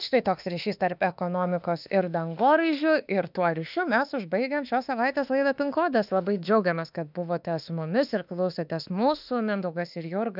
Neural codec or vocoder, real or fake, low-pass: autoencoder, 48 kHz, 32 numbers a frame, DAC-VAE, trained on Japanese speech; fake; 5.4 kHz